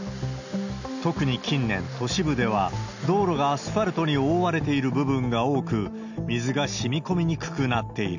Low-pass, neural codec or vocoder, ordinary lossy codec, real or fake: 7.2 kHz; none; none; real